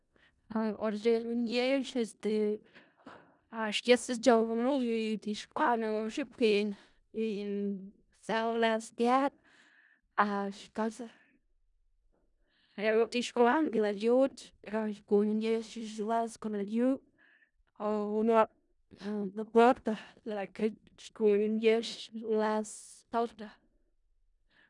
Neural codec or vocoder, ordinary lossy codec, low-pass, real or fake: codec, 16 kHz in and 24 kHz out, 0.4 kbps, LongCat-Audio-Codec, four codebook decoder; none; 10.8 kHz; fake